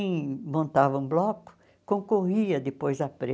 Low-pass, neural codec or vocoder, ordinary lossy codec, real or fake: none; none; none; real